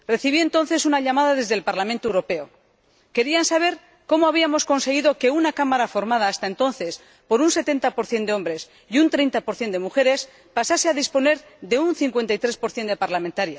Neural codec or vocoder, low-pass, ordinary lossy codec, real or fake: none; none; none; real